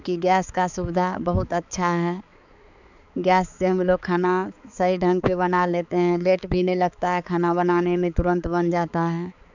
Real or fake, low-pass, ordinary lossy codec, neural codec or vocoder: fake; 7.2 kHz; none; codec, 16 kHz, 4 kbps, X-Codec, HuBERT features, trained on balanced general audio